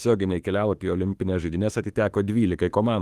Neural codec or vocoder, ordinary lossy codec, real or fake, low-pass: autoencoder, 48 kHz, 32 numbers a frame, DAC-VAE, trained on Japanese speech; Opus, 24 kbps; fake; 14.4 kHz